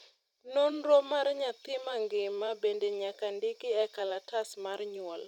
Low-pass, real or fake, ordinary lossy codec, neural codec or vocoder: 19.8 kHz; fake; none; vocoder, 44.1 kHz, 128 mel bands every 512 samples, BigVGAN v2